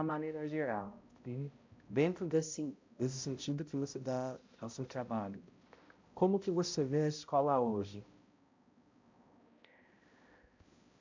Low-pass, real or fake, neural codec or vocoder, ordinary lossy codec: 7.2 kHz; fake; codec, 16 kHz, 0.5 kbps, X-Codec, HuBERT features, trained on balanced general audio; MP3, 48 kbps